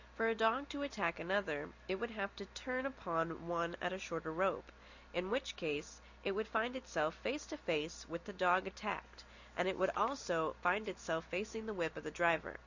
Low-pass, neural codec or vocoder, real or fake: 7.2 kHz; none; real